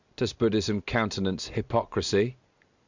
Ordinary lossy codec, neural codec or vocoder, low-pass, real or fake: Opus, 64 kbps; none; 7.2 kHz; real